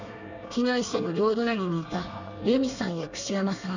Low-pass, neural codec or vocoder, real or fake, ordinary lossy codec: 7.2 kHz; codec, 24 kHz, 1 kbps, SNAC; fake; none